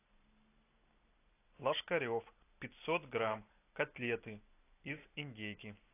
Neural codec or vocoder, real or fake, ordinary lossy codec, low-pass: none; real; AAC, 24 kbps; 3.6 kHz